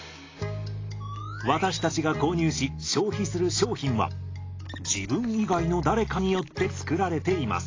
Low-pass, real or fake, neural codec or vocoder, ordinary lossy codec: 7.2 kHz; real; none; AAC, 32 kbps